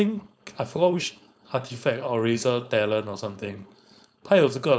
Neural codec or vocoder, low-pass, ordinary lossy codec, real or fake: codec, 16 kHz, 4.8 kbps, FACodec; none; none; fake